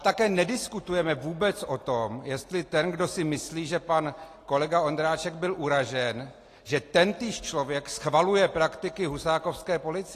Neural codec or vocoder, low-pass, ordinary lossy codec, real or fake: none; 14.4 kHz; AAC, 48 kbps; real